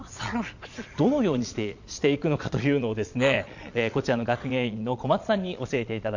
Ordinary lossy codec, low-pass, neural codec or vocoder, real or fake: none; 7.2 kHz; vocoder, 22.05 kHz, 80 mel bands, Vocos; fake